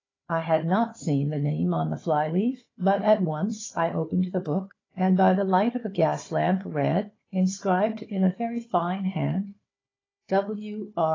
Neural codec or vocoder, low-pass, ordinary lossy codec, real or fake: codec, 16 kHz, 4 kbps, FunCodec, trained on Chinese and English, 50 frames a second; 7.2 kHz; AAC, 32 kbps; fake